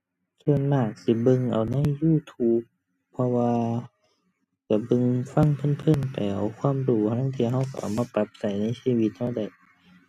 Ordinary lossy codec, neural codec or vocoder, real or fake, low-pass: none; none; real; 14.4 kHz